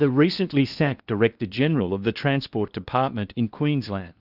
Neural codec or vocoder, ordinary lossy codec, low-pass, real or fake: codec, 16 kHz in and 24 kHz out, 0.8 kbps, FocalCodec, streaming, 65536 codes; Opus, 64 kbps; 5.4 kHz; fake